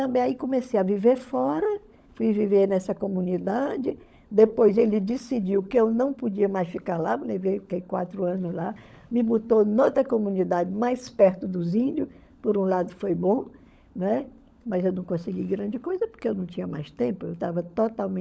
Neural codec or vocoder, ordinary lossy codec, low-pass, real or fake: codec, 16 kHz, 16 kbps, FunCodec, trained on LibriTTS, 50 frames a second; none; none; fake